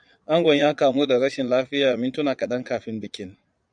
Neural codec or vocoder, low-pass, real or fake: vocoder, 22.05 kHz, 80 mel bands, Vocos; 9.9 kHz; fake